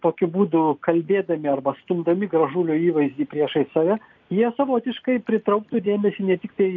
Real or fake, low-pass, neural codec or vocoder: real; 7.2 kHz; none